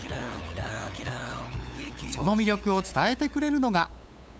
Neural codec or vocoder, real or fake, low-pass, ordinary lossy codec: codec, 16 kHz, 8 kbps, FunCodec, trained on LibriTTS, 25 frames a second; fake; none; none